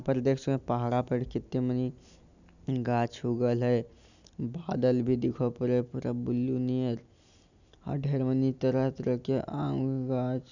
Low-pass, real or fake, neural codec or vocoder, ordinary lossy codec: 7.2 kHz; real; none; none